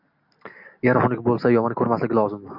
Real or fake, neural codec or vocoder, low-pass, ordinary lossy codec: real; none; 5.4 kHz; AAC, 48 kbps